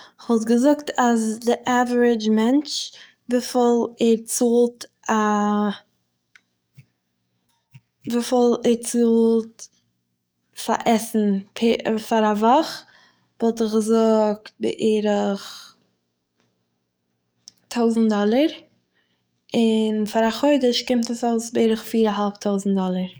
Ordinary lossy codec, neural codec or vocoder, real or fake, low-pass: none; codec, 44.1 kHz, 7.8 kbps, DAC; fake; none